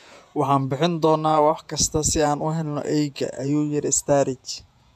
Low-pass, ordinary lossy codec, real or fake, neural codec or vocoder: 14.4 kHz; MP3, 96 kbps; fake; vocoder, 48 kHz, 128 mel bands, Vocos